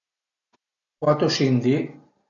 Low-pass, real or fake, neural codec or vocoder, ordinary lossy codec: 7.2 kHz; real; none; MP3, 48 kbps